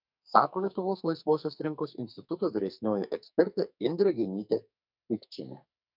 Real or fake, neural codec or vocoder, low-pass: fake; codec, 44.1 kHz, 2.6 kbps, SNAC; 5.4 kHz